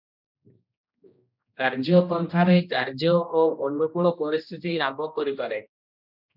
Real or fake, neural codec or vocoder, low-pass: fake; codec, 16 kHz, 1 kbps, X-Codec, HuBERT features, trained on general audio; 5.4 kHz